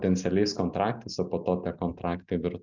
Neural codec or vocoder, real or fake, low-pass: none; real; 7.2 kHz